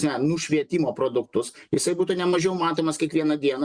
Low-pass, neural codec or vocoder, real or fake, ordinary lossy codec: 9.9 kHz; none; real; Opus, 64 kbps